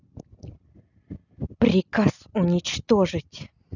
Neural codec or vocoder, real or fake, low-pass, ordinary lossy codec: none; real; 7.2 kHz; none